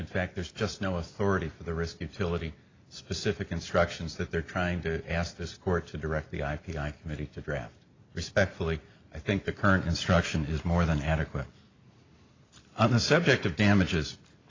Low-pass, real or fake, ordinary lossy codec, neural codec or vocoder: 7.2 kHz; real; AAC, 32 kbps; none